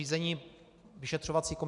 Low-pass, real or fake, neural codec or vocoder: 10.8 kHz; real; none